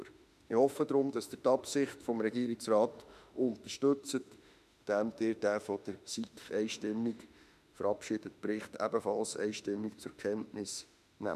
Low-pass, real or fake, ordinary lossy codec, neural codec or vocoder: 14.4 kHz; fake; none; autoencoder, 48 kHz, 32 numbers a frame, DAC-VAE, trained on Japanese speech